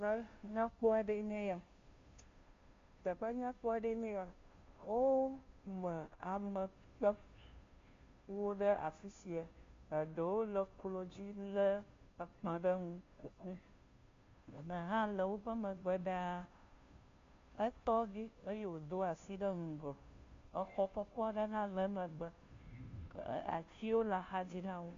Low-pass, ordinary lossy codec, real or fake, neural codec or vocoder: 7.2 kHz; MP3, 48 kbps; fake; codec, 16 kHz, 0.5 kbps, FunCodec, trained on Chinese and English, 25 frames a second